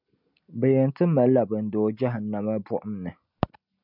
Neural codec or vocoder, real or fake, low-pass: none; real; 5.4 kHz